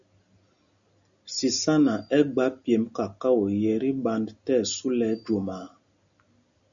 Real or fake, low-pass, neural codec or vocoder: real; 7.2 kHz; none